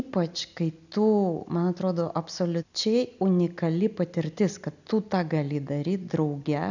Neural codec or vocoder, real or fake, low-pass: none; real; 7.2 kHz